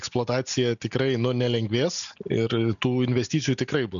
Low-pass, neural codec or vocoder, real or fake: 7.2 kHz; none; real